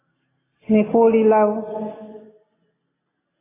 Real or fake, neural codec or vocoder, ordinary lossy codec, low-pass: real; none; AAC, 16 kbps; 3.6 kHz